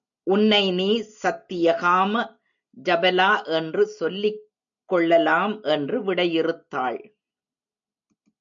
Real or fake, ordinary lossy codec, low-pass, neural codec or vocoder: real; MP3, 64 kbps; 7.2 kHz; none